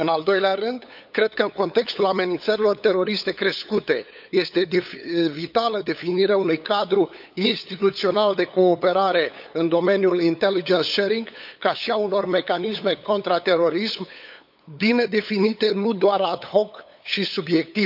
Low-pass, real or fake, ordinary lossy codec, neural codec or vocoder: 5.4 kHz; fake; none; codec, 16 kHz, 8 kbps, FunCodec, trained on LibriTTS, 25 frames a second